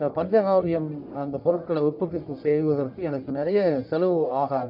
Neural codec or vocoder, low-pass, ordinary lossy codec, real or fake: codec, 44.1 kHz, 1.7 kbps, Pupu-Codec; 5.4 kHz; none; fake